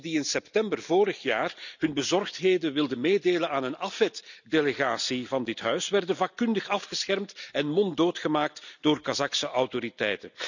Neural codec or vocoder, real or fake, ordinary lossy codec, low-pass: none; real; none; 7.2 kHz